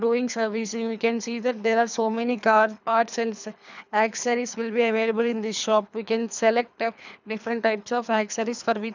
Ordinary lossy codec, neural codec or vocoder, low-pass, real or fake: none; codec, 24 kHz, 3 kbps, HILCodec; 7.2 kHz; fake